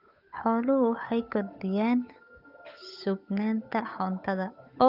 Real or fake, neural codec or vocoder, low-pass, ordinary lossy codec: fake; codec, 16 kHz, 8 kbps, FunCodec, trained on Chinese and English, 25 frames a second; 5.4 kHz; none